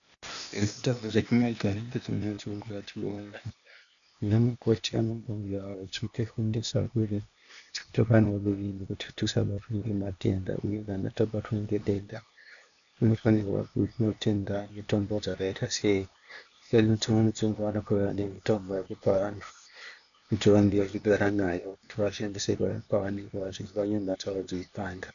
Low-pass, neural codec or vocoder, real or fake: 7.2 kHz; codec, 16 kHz, 0.8 kbps, ZipCodec; fake